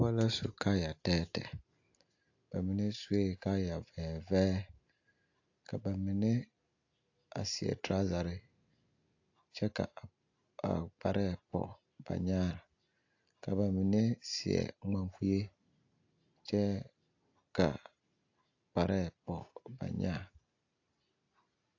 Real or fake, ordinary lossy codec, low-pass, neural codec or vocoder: real; AAC, 48 kbps; 7.2 kHz; none